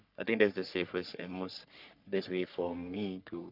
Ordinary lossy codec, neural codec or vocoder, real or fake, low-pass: none; codec, 44.1 kHz, 3.4 kbps, Pupu-Codec; fake; 5.4 kHz